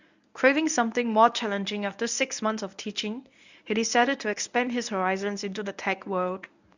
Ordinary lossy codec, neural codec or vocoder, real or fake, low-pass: none; codec, 24 kHz, 0.9 kbps, WavTokenizer, medium speech release version 1; fake; 7.2 kHz